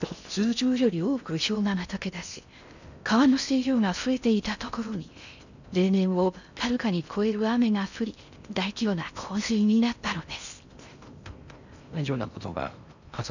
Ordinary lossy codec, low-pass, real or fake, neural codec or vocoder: none; 7.2 kHz; fake; codec, 16 kHz in and 24 kHz out, 0.6 kbps, FocalCodec, streaming, 4096 codes